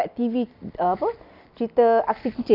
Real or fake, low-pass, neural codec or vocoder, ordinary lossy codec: real; 5.4 kHz; none; none